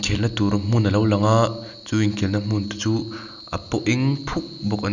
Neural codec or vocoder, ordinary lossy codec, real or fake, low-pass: none; none; real; 7.2 kHz